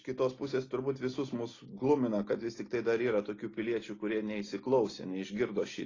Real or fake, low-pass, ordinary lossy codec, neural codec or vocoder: real; 7.2 kHz; AAC, 32 kbps; none